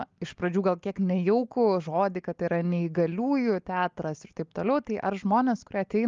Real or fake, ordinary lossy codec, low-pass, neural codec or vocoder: real; Opus, 32 kbps; 7.2 kHz; none